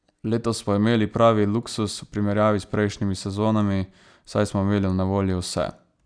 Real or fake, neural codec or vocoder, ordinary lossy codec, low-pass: real; none; none; 9.9 kHz